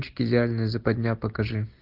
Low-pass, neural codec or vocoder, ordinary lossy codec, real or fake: 5.4 kHz; none; Opus, 32 kbps; real